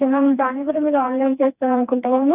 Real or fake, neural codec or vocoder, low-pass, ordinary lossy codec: fake; codec, 16 kHz, 2 kbps, FreqCodec, smaller model; 3.6 kHz; none